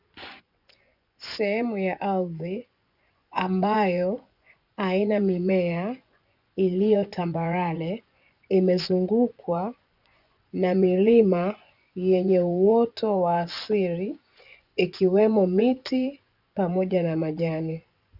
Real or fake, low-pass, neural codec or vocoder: fake; 5.4 kHz; vocoder, 24 kHz, 100 mel bands, Vocos